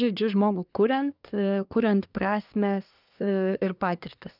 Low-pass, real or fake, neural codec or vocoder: 5.4 kHz; fake; codec, 24 kHz, 1 kbps, SNAC